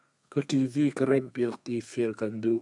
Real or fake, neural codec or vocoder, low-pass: fake; codec, 32 kHz, 1.9 kbps, SNAC; 10.8 kHz